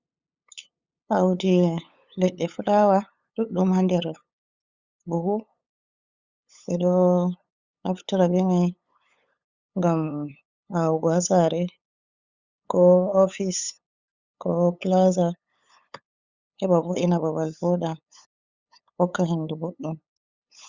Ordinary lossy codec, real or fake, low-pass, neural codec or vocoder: Opus, 64 kbps; fake; 7.2 kHz; codec, 16 kHz, 8 kbps, FunCodec, trained on LibriTTS, 25 frames a second